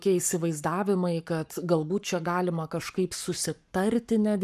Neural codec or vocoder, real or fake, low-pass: codec, 44.1 kHz, 7.8 kbps, Pupu-Codec; fake; 14.4 kHz